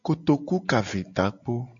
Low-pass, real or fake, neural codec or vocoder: 7.2 kHz; real; none